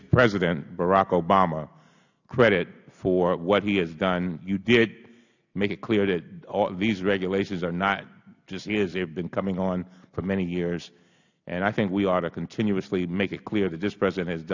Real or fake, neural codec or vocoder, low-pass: real; none; 7.2 kHz